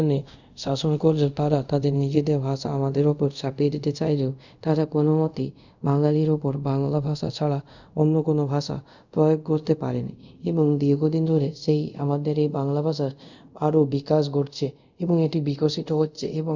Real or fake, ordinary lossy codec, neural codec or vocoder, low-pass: fake; none; codec, 24 kHz, 0.5 kbps, DualCodec; 7.2 kHz